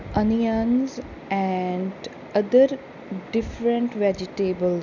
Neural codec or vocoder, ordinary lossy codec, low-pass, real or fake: none; none; 7.2 kHz; real